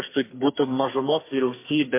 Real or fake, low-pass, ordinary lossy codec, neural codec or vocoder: fake; 3.6 kHz; MP3, 24 kbps; codec, 44.1 kHz, 2.6 kbps, SNAC